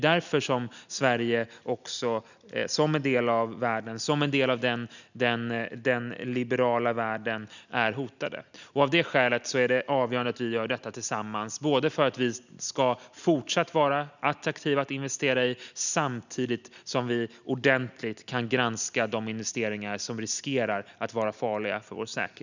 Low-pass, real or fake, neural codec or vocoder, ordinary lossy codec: 7.2 kHz; real; none; none